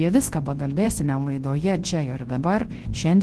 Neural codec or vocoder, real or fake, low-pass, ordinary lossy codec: codec, 24 kHz, 0.9 kbps, WavTokenizer, large speech release; fake; 10.8 kHz; Opus, 16 kbps